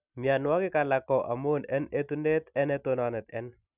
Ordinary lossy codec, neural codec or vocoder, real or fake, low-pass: none; none; real; 3.6 kHz